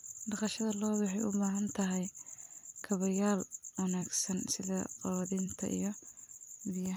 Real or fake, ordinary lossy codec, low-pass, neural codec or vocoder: fake; none; none; vocoder, 44.1 kHz, 128 mel bands every 256 samples, BigVGAN v2